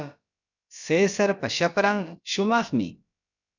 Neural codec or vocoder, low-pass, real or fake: codec, 16 kHz, about 1 kbps, DyCAST, with the encoder's durations; 7.2 kHz; fake